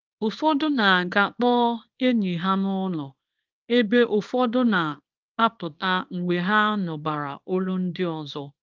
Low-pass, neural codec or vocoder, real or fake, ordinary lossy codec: 7.2 kHz; codec, 24 kHz, 0.9 kbps, WavTokenizer, small release; fake; Opus, 32 kbps